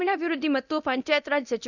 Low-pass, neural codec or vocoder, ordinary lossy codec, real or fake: 7.2 kHz; codec, 24 kHz, 0.9 kbps, DualCodec; none; fake